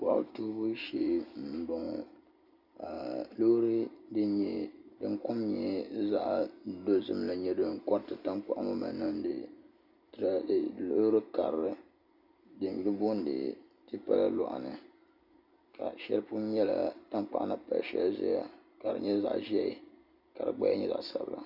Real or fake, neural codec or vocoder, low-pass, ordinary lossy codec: real; none; 5.4 kHz; Opus, 64 kbps